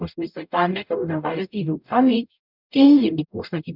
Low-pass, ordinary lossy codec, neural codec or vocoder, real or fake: 5.4 kHz; none; codec, 44.1 kHz, 0.9 kbps, DAC; fake